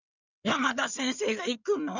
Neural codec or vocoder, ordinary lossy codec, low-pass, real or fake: codec, 16 kHz in and 24 kHz out, 2.2 kbps, FireRedTTS-2 codec; none; 7.2 kHz; fake